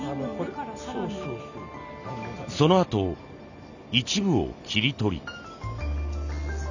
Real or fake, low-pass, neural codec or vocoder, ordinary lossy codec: real; 7.2 kHz; none; none